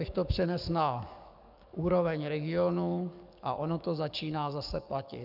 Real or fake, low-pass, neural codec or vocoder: fake; 5.4 kHz; codec, 44.1 kHz, 7.8 kbps, DAC